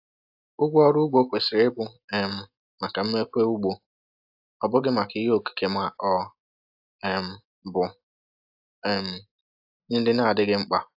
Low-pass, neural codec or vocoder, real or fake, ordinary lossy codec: 5.4 kHz; none; real; AAC, 48 kbps